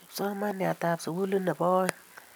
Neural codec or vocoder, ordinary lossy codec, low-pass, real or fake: none; none; none; real